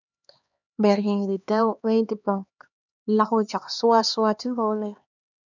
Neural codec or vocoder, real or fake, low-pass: codec, 16 kHz, 2 kbps, X-Codec, HuBERT features, trained on LibriSpeech; fake; 7.2 kHz